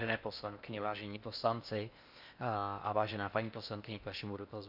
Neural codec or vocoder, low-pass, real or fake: codec, 16 kHz in and 24 kHz out, 0.6 kbps, FocalCodec, streaming, 4096 codes; 5.4 kHz; fake